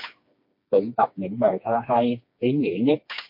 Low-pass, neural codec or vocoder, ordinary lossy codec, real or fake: 5.4 kHz; codec, 16 kHz, 2 kbps, FreqCodec, smaller model; MP3, 32 kbps; fake